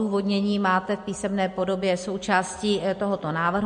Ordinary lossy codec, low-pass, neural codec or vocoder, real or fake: MP3, 48 kbps; 9.9 kHz; none; real